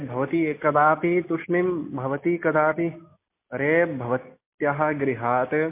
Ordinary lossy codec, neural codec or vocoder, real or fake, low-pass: MP3, 24 kbps; none; real; 3.6 kHz